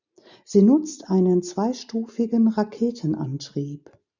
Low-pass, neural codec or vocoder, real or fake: 7.2 kHz; none; real